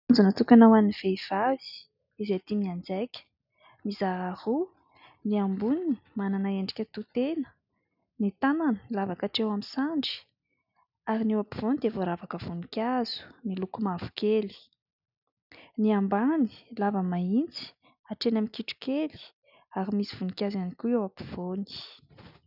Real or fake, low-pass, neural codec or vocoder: real; 5.4 kHz; none